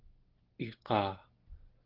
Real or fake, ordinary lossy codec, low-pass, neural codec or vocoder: real; Opus, 16 kbps; 5.4 kHz; none